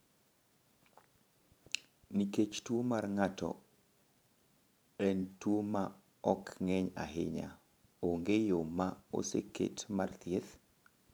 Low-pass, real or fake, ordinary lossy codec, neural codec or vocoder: none; fake; none; vocoder, 44.1 kHz, 128 mel bands every 512 samples, BigVGAN v2